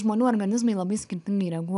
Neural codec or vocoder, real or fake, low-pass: none; real; 10.8 kHz